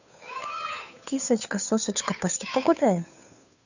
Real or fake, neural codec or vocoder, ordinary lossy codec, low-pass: fake; codec, 16 kHz, 2 kbps, FunCodec, trained on Chinese and English, 25 frames a second; none; 7.2 kHz